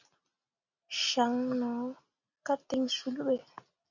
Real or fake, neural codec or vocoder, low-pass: real; none; 7.2 kHz